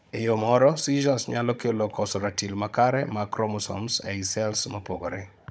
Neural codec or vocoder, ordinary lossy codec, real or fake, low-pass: codec, 16 kHz, 16 kbps, FunCodec, trained on Chinese and English, 50 frames a second; none; fake; none